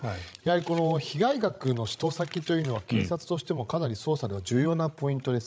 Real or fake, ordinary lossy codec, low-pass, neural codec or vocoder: fake; none; none; codec, 16 kHz, 16 kbps, FreqCodec, larger model